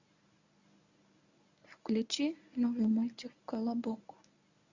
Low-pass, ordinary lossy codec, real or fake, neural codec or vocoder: 7.2 kHz; Opus, 64 kbps; fake; codec, 24 kHz, 0.9 kbps, WavTokenizer, medium speech release version 1